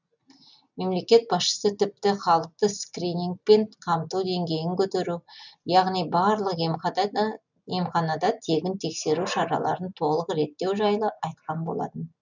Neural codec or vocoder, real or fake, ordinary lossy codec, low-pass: none; real; none; 7.2 kHz